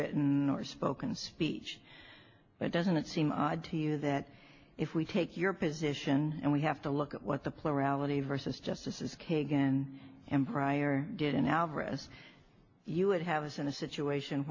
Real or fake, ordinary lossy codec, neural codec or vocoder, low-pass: real; AAC, 48 kbps; none; 7.2 kHz